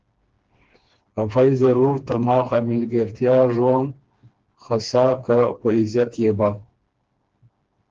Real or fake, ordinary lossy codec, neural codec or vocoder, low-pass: fake; Opus, 16 kbps; codec, 16 kHz, 2 kbps, FreqCodec, smaller model; 7.2 kHz